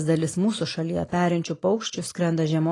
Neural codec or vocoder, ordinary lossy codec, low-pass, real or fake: none; AAC, 32 kbps; 10.8 kHz; real